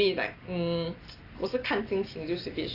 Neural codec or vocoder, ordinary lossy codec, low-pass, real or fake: none; AAC, 24 kbps; 5.4 kHz; real